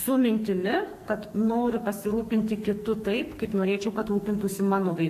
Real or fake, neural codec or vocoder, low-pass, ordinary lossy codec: fake; codec, 44.1 kHz, 2.6 kbps, SNAC; 14.4 kHz; AAC, 64 kbps